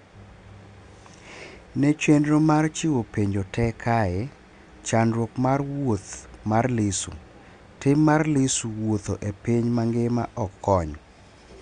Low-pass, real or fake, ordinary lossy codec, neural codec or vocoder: 9.9 kHz; real; Opus, 64 kbps; none